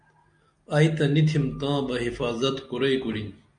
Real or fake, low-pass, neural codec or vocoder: real; 9.9 kHz; none